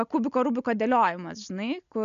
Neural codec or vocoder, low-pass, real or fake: none; 7.2 kHz; real